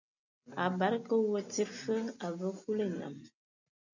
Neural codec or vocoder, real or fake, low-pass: none; real; 7.2 kHz